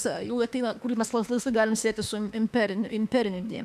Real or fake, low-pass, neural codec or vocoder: fake; 14.4 kHz; autoencoder, 48 kHz, 32 numbers a frame, DAC-VAE, trained on Japanese speech